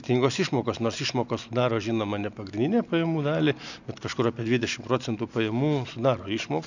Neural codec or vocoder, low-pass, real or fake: none; 7.2 kHz; real